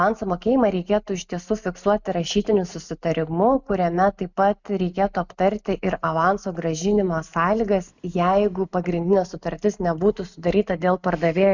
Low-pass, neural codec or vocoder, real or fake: 7.2 kHz; none; real